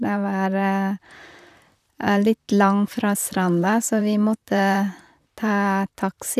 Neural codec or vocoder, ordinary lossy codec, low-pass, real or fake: vocoder, 44.1 kHz, 128 mel bands, Pupu-Vocoder; none; 14.4 kHz; fake